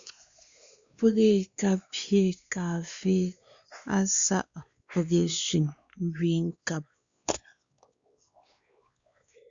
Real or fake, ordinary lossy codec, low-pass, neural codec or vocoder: fake; Opus, 64 kbps; 7.2 kHz; codec, 16 kHz, 2 kbps, X-Codec, WavLM features, trained on Multilingual LibriSpeech